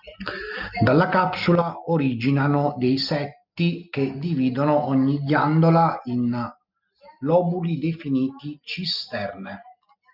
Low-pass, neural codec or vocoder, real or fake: 5.4 kHz; none; real